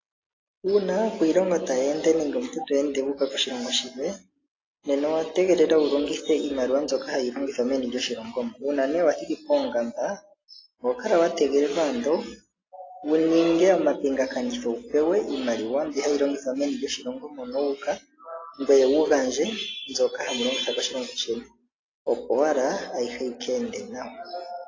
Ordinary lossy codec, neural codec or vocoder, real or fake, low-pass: AAC, 32 kbps; none; real; 7.2 kHz